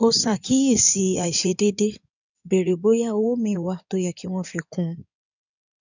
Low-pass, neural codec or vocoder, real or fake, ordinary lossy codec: 7.2 kHz; codec, 16 kHz in and 24 kHz out, 2.2 kbps, FireRedTTS-2 codec; fake; none